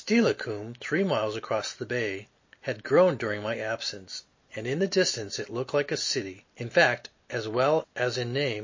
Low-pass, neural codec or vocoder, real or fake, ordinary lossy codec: 7.2 kHz; none; real; MP3, 32 kbps